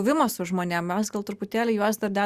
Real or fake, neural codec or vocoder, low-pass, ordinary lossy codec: real; none; 14.4 kHz; Opus, 64 kbps